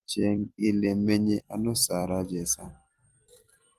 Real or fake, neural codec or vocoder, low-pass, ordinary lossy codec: fake; vocoder, 44.1 kHz, 128 mel bands every 256 samples, BigVGAN v2; 14.4 kHz; Opus, 24 kbps